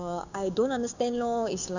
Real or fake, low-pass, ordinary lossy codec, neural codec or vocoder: fake; 7.2 kHz; none; codec, 16 kHz, 6 kbps, DAC